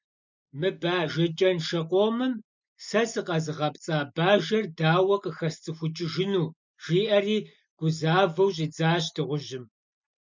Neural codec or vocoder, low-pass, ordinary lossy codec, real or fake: none; 7.2 kHz; MP3, 64 kbps; real